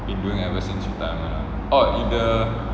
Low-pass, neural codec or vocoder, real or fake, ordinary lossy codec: none; none; real; none